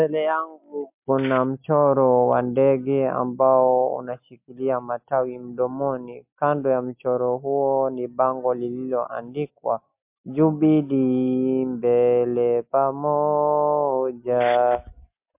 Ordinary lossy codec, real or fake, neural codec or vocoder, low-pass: MP3, 32 kbps; real; none; 3.6 kHz